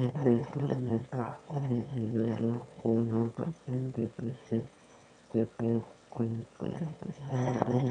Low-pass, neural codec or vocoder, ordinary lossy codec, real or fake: 9.9 kHz; autoencoder, 22.05 kHz, a latent of 192 numbers a frame, VITS, trained on one speaker; none; fake